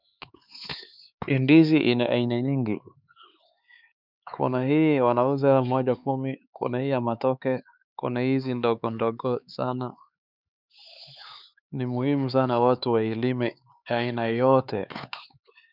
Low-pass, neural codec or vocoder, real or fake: 5.4 kHz; codec, 16 kHz, 4 kbps, X-Codec, HuBERT features, trained on LibriSpeech; fake